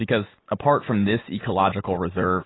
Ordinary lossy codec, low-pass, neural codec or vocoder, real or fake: AAC, 16 kbps; 7.2 kHz; none; real